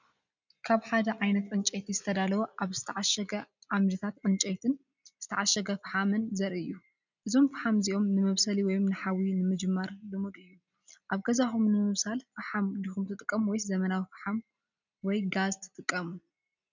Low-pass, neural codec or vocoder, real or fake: 7.2 kHz; none; real